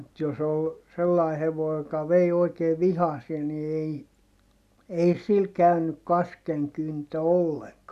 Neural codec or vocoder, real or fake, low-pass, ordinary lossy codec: none; real; 14.4 kHz; none